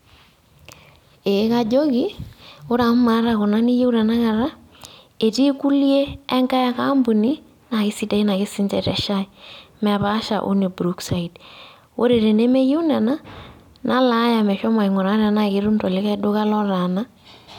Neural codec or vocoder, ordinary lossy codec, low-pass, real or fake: none; none; 19.8 kHz; real